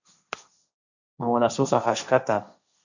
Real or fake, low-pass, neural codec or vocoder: fake; 7.2 kHz; codec, 16 kHz, 1.1 kbps, Voila-Tokenizer